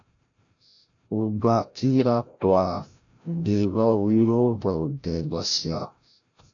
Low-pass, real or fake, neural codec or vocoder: 7.2 kHz; fake; codec, 16 kHz, 0.5 kbps, FreqCodec, larger model